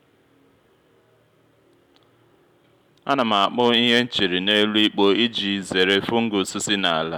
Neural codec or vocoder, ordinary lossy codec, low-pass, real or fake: none; none; 19.8 kHz; real